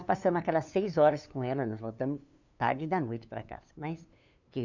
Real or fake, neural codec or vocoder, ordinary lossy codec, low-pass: fake; codec, 16 kHz, 2 kbps, FunCodec, trained on LibriTTS, 25 frames a second; none; 7.2 kHz